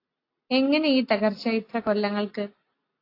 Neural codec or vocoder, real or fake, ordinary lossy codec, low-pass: none; real; AAC, 32 kbps; 5.4 kHz